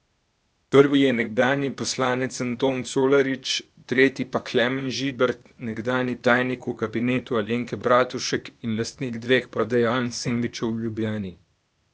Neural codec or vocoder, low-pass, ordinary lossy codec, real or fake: codec, 16 kHz, 0.8 kbps, ZipCodec; none; none; fake